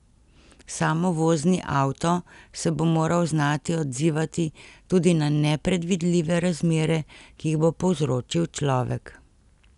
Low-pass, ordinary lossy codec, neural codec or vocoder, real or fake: 10.8 kHz; none; none; real